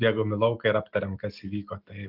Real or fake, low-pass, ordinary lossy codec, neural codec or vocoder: real; 5.4 kHz; Opus, 24 kbps; none